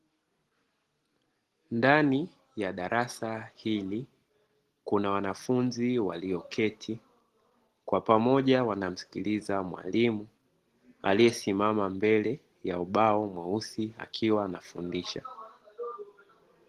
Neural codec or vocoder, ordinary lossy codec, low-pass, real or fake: none; Opus, 16 kbps; 14.4 kHz; real